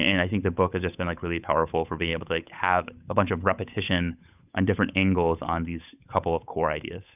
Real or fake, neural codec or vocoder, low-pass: fake; codec, 16 kHz, 8 kbps, FunCodec, trained on Chinese and English, 25 frames a second; 3.6 kHz